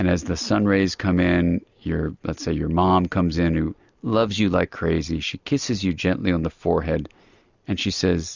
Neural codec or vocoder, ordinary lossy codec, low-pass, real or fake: none; Opus, 64 kbps; 7.2 kHz; real